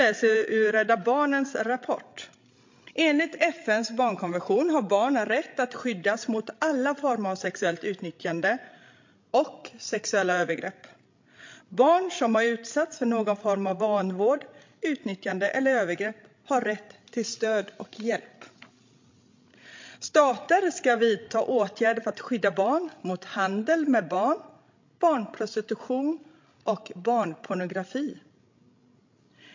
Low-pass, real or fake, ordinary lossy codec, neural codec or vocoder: 7.2 kHz; fake; MP3, 48 kbps; codec, 16 kHz, 16 kbps, FreqCodec, larger model